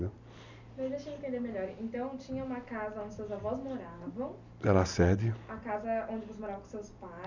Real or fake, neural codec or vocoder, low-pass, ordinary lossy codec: real; none; 7.2 kHz; none